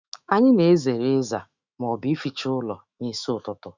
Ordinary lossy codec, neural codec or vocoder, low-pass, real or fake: Opus, 64 kbps; autoencoder, 48 kHz, 128 numbers a frame, DAC-VAE, trained on Japanese speech; 7.2 kHz; fake